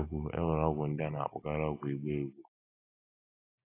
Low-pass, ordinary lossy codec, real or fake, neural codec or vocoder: 3.6 kHz; AAC, 24 kbps; real; none